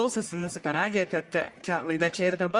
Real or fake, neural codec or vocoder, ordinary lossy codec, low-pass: fake; codec, 44.1 kHz, 1.7 kbps, Pupu-Codec; Opus, 64 kbps; 10.8 kHz